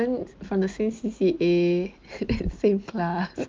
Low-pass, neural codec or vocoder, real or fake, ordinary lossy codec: 7.2 kHz; none; real; Opus, 32 kbps